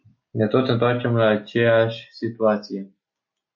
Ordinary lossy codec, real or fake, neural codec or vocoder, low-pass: MP3, 48 kbps; real; none; 7.2 kHz